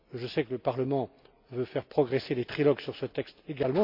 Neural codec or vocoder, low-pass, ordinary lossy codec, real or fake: none; 5.4 kHz; MP3, 48 kbps; real